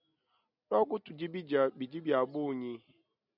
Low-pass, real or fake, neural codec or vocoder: 5.4 kHz; real; none